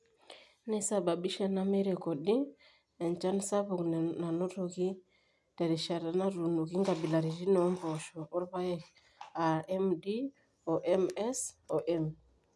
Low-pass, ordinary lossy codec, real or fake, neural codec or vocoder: none; none; real; none